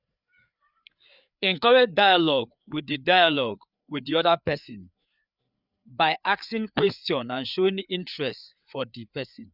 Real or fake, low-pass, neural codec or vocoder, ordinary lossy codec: fake; 5.4 kHz; codec, 16 kHz, 4 kbps, FreqCodec, larger model; none